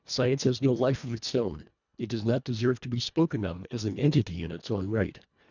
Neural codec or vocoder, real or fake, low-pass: codec, 24 kHz, 1.5 kbps, HILCodec; fake; 7.2 kHz